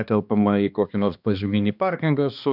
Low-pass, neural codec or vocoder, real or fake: 5.4 kHz; codec, 16 kHz, 2 kbps, X-Codec, HuBERT features, trained on balanced general audio; fake